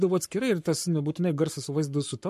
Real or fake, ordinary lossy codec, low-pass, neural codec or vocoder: fake; MP3, 64 kbps; 14.4 kHz; codec, 44.1 kHz, 7.8 kbps, Pupu-Codec